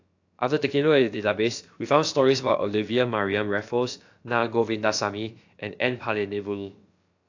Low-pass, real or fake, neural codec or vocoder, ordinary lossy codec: 7.2 kHz; fake; codec, 16 kHz, about 1 kbps, DyCAST, with the encoder's durations; AAC, 48 kbps